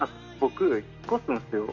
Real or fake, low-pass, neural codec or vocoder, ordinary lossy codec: real; 7.2 kHz; none; none